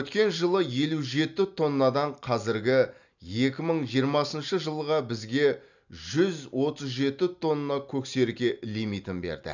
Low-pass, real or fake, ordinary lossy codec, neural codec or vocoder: 7.2 kHz; real; none; none